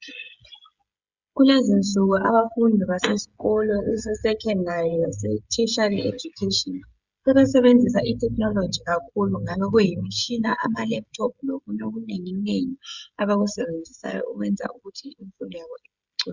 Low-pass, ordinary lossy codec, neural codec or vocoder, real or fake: 7.2 kHz; Opus, 64 kbps; codec, 16 kHz, 16 kbps, FreqCodec, smaller model; fake